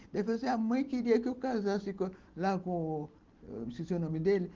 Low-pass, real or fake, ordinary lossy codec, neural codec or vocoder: 7.2 kHz; real; Opus, 16 kbps; none